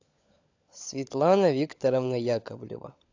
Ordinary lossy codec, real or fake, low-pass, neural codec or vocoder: AAC, 48 kbps; fake; 7.2 kHz; codec, 16 kHz, 16 kbps, FunCodec, trained on LibriTTS, 50 frames a second